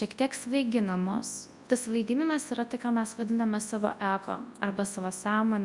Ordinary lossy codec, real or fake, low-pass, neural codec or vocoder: Opus, 64 kbps; fake; 10.8 kHz; codec, 24 kHz, 0.9 kbps, WavTokenizer, large speech release